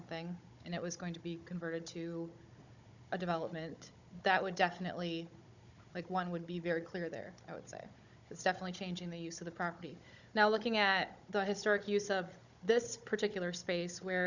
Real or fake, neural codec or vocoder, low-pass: fake; codec, 16 kHz, 16 kbps, FunCodec, trained on Chinese and English, 50 frames a second; 7.2 kHz